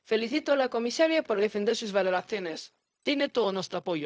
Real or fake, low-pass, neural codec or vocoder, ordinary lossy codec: fake; none; codec, 16 kHz, 0.4 kbps, LongCat-Audio-Codec; none